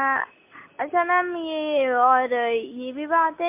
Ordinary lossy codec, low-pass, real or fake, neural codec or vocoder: MP3, 24 kbps; 3.6 kHz; real; none